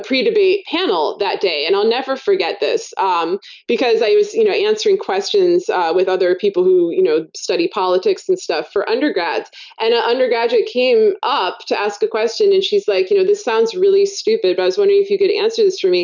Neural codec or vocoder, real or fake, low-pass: none; real; 7.2 kHz